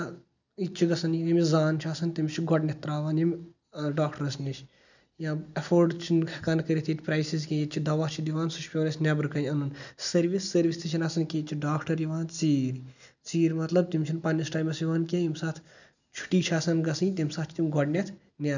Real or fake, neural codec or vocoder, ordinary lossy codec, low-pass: real; none; none; 7.2 kHz